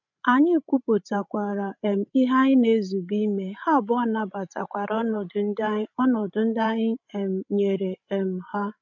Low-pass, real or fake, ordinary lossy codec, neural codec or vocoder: 7.2 kHz; fake; none; codec, 16 kHz, 16 kbps, FreqCodec, larger model